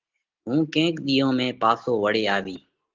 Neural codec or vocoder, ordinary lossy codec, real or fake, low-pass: none; Opus, 16 kbps; real; 7.2 kHz